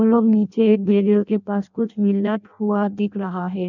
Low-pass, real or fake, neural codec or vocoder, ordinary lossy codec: 7.2 kHz; fake; codec, 16 kHz in and 24 kHz out, 0.6 kbps, FireRedTTS-2 codec; none